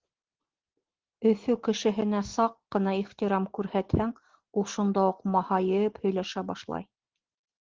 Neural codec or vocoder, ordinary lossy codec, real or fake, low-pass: none; Opus, 16 kbps; real; 7.2 kHz